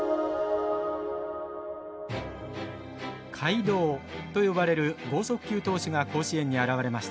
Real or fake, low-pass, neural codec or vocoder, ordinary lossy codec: real; none; none; none